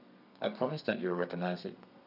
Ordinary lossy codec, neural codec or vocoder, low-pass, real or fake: none; codec, 32 kHz, 1.9 kbps, SNAC; 5.4 kHz; fake